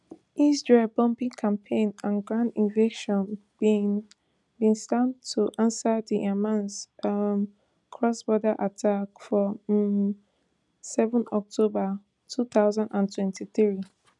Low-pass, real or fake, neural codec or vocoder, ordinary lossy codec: 10.8 kHz; real; none; none